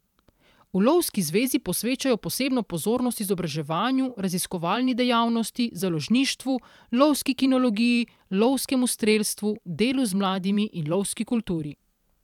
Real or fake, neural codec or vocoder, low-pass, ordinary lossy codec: fake; vocoder, 44.1 kHz, 128 mel bands every 512 samples, BigVGAN v2; 19.8 kHz; none